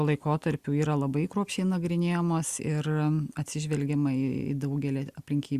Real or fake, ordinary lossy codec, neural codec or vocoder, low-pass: real; Opus, 64 kbps; none; 14.4 kHz